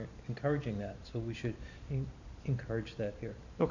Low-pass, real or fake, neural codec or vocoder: 7.2 kHz; fake; vocoder, 44.1 kHz, 128 mel bands every 512 samples, BigVGAN v2